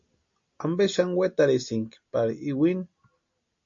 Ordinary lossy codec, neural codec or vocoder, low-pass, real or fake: MP3, 48 kbps; none; 7.2 kHz; real